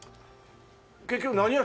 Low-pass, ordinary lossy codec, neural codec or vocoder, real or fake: none; none; none; real